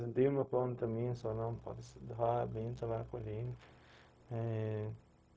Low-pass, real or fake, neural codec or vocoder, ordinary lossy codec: none; fake; codec, 16 kHz, 0.4 kbps, LongCat-Audio-Codec; none